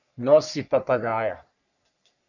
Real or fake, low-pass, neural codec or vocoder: fake; 7.2 kHz; codec, 44.1 kHz, 3.4 kbps, Pupu-Codec